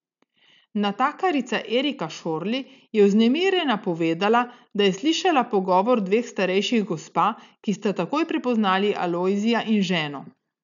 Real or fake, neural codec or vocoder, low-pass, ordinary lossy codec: real; none; 7.2 kHz; none